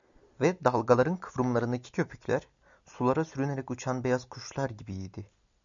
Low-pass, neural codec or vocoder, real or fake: 7.2 kHz; none; real